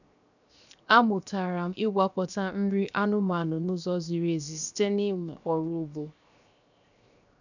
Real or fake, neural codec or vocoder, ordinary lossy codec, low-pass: fake; codec, 16 kHz, 0.7 kbps, FocalCodec; none; 7.2 kHz